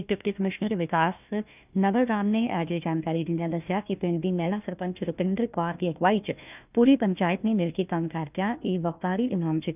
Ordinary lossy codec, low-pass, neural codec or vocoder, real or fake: none; 3.6 kHz; codec, 16 kHz, 1 kbps, FunCodec, trained on Chinese and English, 50 frames a second; fake